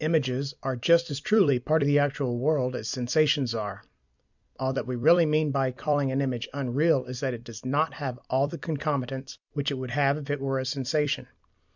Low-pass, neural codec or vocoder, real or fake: 7.2 kHz; vocoder, 44.1 kHz, 128 mel bands every 256 samples, BigVGAN v2; fake